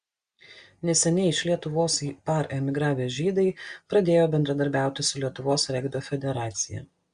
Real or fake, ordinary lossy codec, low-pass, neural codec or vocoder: real; Opus, 64 kbps; 9.9 kHz; none